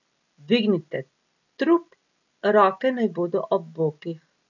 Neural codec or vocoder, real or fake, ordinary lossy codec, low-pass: none; real; none; 7.2 kHz